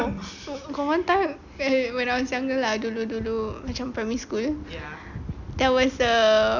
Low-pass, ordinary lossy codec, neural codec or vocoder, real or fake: 7.2 kHz; none; none; real